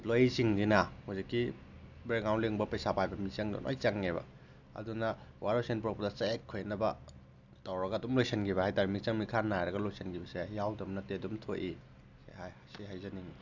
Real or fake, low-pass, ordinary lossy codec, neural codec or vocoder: real; 7.2 kHz; none; none